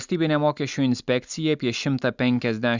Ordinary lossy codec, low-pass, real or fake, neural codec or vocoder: Opus, 64 kbps; 7.2 kHz; real; none